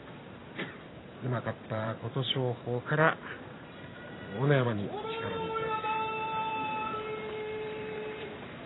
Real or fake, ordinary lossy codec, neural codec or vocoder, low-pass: fake; AAC, 16 kbps; vocoder, 44.1 kHz, 128 mel bands every 512 samples, BigVGAN v2; 7.2 kHz